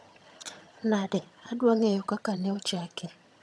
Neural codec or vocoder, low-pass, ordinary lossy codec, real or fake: vocoder, 22.05 kHz, 80 mel bands, HiFi-GAN; none; none; fake